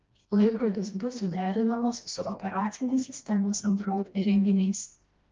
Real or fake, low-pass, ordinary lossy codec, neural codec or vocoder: fake; 7.2 kHz; Opus, 24 kbps; codec, 16 kHz, 1 kbps, FreqCodec, smaller model